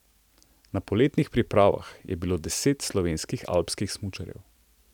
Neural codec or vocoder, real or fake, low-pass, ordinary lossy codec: none; real; 19.8 kHz; none